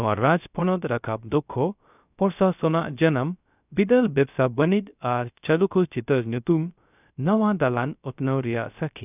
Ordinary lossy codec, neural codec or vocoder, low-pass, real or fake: none; codec, 16 kHz, 0.3 kbps, FocalCodec; 3.6 kHz; fake